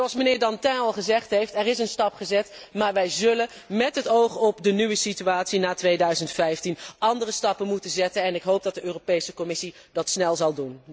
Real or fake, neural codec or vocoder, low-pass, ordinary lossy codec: real; none; none; none